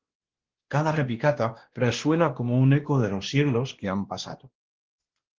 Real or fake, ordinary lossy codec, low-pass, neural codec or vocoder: fake; Opus, 16 kbps; 7.2 kHz; codec, 16 kHz, 1 kbps, X-Codec, WavLM features, trained on Multilingual LibriSpeech